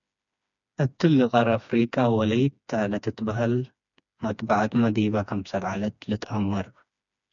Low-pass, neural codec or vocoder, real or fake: 7.2 kHz; codec, 16 kHz, 2 kbps, FreqCodec, smaller model; fake